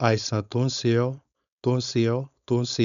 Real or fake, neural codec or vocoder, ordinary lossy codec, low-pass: fake; codec, 16 kHz, 4.8 kbps, FACodec; none; 7.2 kHz